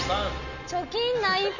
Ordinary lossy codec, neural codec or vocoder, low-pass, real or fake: none; none; 7.2 kHz; real